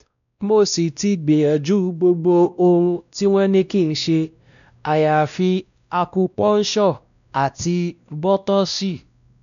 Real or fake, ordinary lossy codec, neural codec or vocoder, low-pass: fake; none; codec, 16 kHz, 1 kbps, X-Codec, WavLM features, trained on Multilingual LibriSpeech; 7.2 kHz